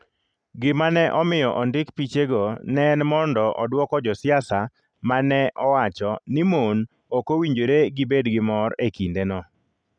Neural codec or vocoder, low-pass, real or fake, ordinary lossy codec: none; 9.9 kHz; real; none